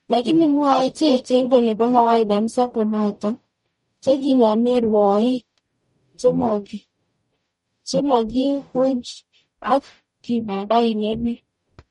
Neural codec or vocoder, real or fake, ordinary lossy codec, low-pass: codec, 44.1 kHz, 0.9 kbps, DAC; fake; MP3, 48 kbps; 19.8 kHz